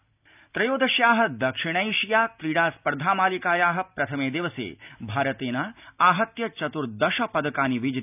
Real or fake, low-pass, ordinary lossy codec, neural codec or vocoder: real; 3.6 kHz; none; none